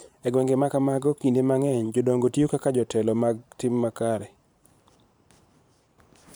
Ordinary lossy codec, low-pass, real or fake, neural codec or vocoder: none; none; fake; vocoder, 44.1 kHz, 128 mel bands every 512 samples, BigVGAN v2